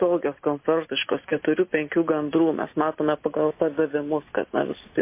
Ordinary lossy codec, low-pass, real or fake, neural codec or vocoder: MP3, 24 kbps; 3.6 kHz; real; none